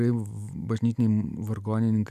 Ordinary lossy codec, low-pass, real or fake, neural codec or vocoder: AAC, 96 kbps; 14.4 kHz; real; none